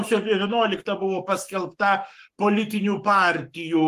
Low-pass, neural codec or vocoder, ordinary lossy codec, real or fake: 14.4 kHz; codec, 44.1 kHz, 7.8 kbps, Pupu-Codec; Opus, 24 kbps; fake